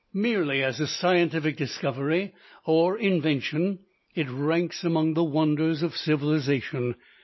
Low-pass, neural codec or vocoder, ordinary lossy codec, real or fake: 7.2 kHz; none; MP3, 24 kbps; real